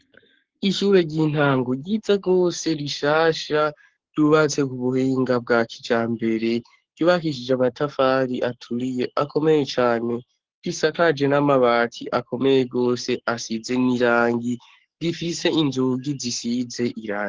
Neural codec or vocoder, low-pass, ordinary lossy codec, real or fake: codec, 44.1 kHz, 7.8 kbps, Pupu-Codec; 7.2 kHz; Opus, 16 kbps; fake